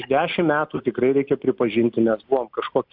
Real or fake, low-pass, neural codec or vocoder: real; 5.4 kHz; none